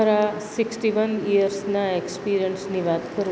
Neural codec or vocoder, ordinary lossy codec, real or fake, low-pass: none; none; real; none